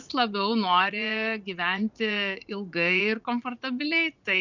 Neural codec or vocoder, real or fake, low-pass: vocoder, 44.1 kHz, 80 mel bands, Vocos; fake; 7.2 kHz